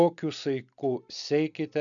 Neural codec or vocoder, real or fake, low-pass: none; real; 7.2 kHz